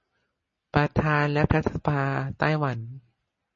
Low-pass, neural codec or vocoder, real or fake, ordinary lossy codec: 7.2 kHz; none; real; MP3, 32 kbps